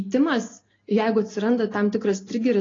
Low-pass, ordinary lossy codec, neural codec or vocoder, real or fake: 7.2 kHz; AAC, 32 kbps; none; real